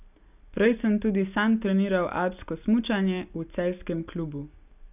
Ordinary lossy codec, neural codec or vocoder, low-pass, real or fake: none; none; 3.6 kHz; real